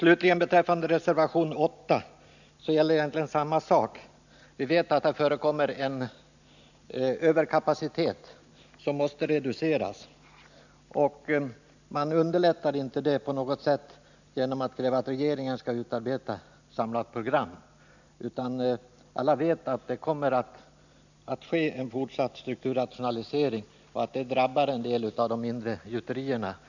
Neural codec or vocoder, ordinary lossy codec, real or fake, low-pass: none; none; real; 7.2 kHz